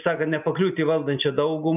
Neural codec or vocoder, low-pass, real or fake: none; 3.6 kHz; real